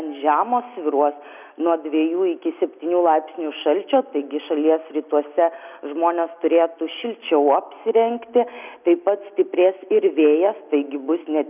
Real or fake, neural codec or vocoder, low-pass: real; none; 3.6 kHz